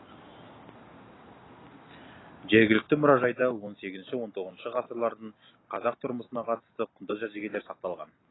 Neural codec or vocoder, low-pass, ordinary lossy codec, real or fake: none; 7.2 kHz; AAC, 16 kbps; real